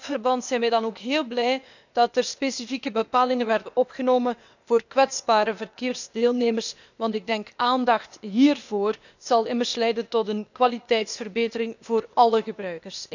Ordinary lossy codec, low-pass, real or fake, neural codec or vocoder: none; 7.2 kHz; fake; codec, 16 kHz, 0.8 kbps, ZipCodec